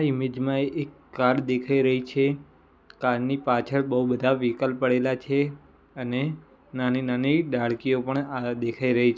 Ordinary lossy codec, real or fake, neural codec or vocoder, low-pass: none; real; none; none